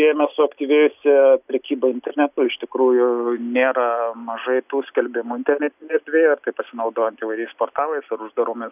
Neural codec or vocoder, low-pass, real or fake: none; 3.6 kHz; real